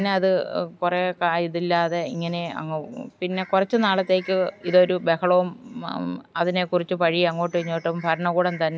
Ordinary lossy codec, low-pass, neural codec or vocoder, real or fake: none; none; none; real